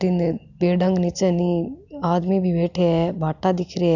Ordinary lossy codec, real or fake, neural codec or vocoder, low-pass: none; real; none; 7.2 kHz